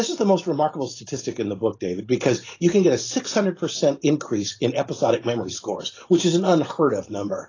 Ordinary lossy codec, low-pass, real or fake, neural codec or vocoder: AAC, 32 kbps; 7.2 kHz; fake; vocoder, 44.1 kHz, 128 mel bands every 512 samples, BigVGAN v2